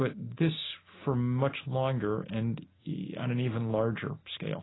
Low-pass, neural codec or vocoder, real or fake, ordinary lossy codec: 7.2 kHz; none; real; AAC, 16 kbps